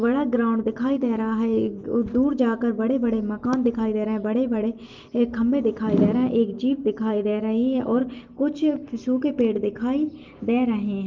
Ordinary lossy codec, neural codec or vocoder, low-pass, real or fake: Opus, 32 kbps; none; 7.2 kHz; real